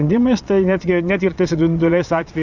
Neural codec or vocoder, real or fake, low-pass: vocoder, 22.05 kHz, 80 mel bands, Vocos; fake; 7.2 kHz